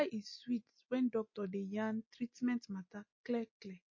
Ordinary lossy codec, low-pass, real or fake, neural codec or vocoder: MP3, 32 kbps; 7.2 kHz; real; none